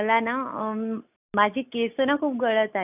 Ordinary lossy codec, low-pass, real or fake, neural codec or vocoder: none; 3.6 kHz; real; none